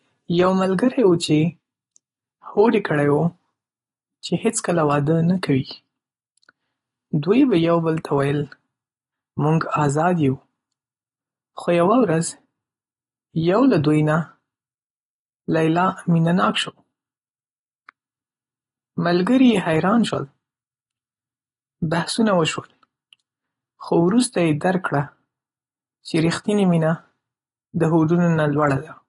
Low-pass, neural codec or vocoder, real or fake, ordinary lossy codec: 10.8 kHz; none; real; AAC, 32 kbps